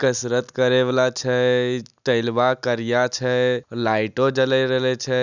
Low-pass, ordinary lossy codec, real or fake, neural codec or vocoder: 7.2 kHz; none; real; none